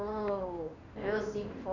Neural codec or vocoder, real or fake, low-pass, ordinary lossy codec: codec, 16 kHz in and 24 kHz out, 1 kbps, XY-Tokenizer; fake; 7.2 kHz; none